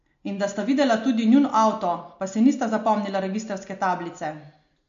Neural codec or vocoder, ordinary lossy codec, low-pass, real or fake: none; MP3, 48 kbps; 7.2 kHz; real